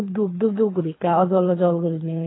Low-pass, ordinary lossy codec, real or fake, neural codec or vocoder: 7.2 kHz; AAC, 16 kbps; fake; codec, 24 kHz, 3 kbps, HILCodec